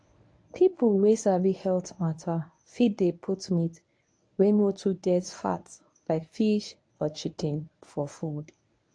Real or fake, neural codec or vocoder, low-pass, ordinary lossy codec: fake; codec, 24 kHz, 0.9 kbps, WavTokenizer, medium speech release version 1; 9.9 kHz; AAC, 48 kbps